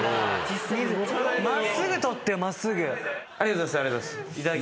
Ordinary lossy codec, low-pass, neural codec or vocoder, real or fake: none; none; none; real